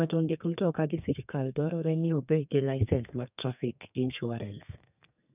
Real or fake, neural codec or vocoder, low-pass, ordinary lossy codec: fake; codec, 32 kHz, 1.9 kbps, SNAC; 3.6 kHz; none